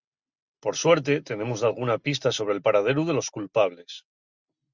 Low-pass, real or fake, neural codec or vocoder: 7.2 kHz; real; none